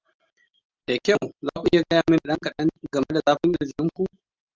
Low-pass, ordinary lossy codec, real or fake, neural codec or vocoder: 7.2 kHz; Opus, 32 kbps; real; none